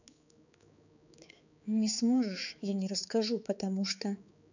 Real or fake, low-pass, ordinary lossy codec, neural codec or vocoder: fake; 7.2 kHz; none; codec, 16 kHz, 4 kbps, X-Codec, HuBERT features, trained on balanced general audio